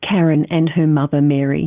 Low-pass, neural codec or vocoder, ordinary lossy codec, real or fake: 3.6 kHz; none; Opus, 24 kbps; real